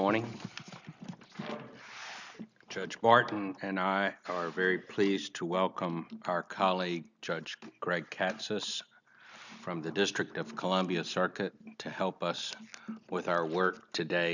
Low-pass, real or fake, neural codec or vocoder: 7.2 kHz; real; none